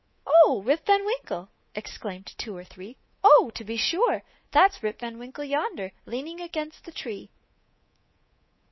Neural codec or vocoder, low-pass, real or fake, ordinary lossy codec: codec, 24 kHz, 3.1 kbps, DualCodec; 7.2 kHz; fake; MP3, 24 kbps